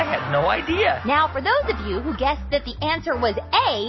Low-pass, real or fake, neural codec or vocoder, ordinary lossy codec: 7.2 kHz; real; none; MP3, 24 kbps